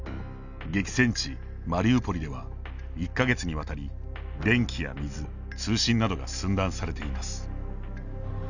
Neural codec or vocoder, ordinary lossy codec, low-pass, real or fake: none; none; 7.2 kHz; real